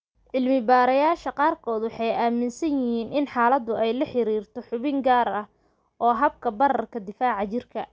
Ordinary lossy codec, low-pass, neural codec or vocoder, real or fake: none; none; none; real